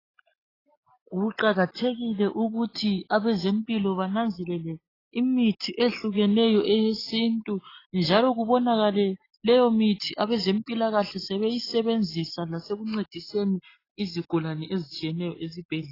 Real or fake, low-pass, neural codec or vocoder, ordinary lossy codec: real; 5.4 kHz; none; AAC, 24 kbps